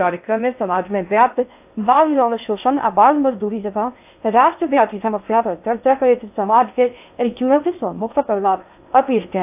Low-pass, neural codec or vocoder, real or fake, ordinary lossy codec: 3.6 kHz; codec, 16 kHz in and 24 kHz out, 0.6 kbps, FocalCodec, streaming, 2048 codes; fake; AAC, 32 kbps